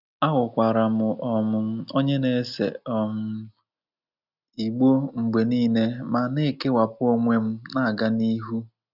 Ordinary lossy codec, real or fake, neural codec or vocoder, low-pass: none; real; none; 5.4 kHz